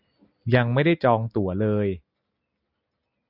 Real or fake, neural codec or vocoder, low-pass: real; none; 5.4 kHz